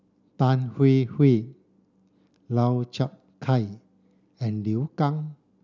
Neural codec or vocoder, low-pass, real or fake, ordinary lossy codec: none; 7.2 kHz; real; none